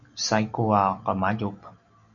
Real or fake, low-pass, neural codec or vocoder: real; 7.2 kHz; none